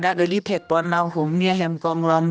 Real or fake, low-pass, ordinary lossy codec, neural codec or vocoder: fake; none; none; codec, 16 kHz, 1 kbps, X-Codec, HuBERT features, trained on general audio